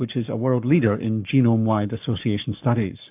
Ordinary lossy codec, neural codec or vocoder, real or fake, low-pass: AAC, 32 kbps; codec, 44.1 kHz, 7.8 kbps, Pupu-Codec; fake; 3.6 kHz